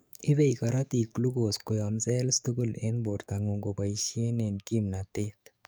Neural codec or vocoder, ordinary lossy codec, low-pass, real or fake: codec, 44.1 kHz, 7.8 kbps, DAC; none; none; fake